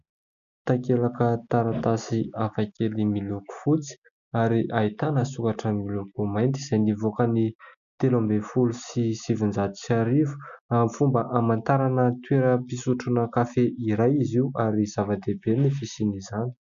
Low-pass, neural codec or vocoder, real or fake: 7.2 kHz; none; real